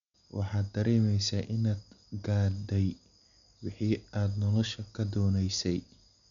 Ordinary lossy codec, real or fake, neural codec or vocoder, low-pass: none; real; none; 7.2 kHz